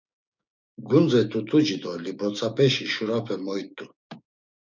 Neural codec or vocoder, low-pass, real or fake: codec, 16 kHz, 6 kbps, DAC; 7.2 kHz; fake